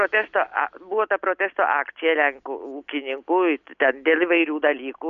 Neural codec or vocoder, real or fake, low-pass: none; real; 7.2 kHz